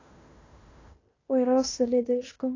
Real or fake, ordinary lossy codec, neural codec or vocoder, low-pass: fake; AAC, 32 kbps; codec, 16 kHz in and 24 kHz out, 0.9 kbps, LongCat-Audio-Codec, fine tuned four codebook decoder; 7.2 kHz